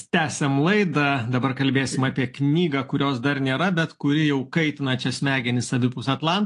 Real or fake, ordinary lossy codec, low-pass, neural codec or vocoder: real; AAC, 48 kbps; 10.8 kHz; none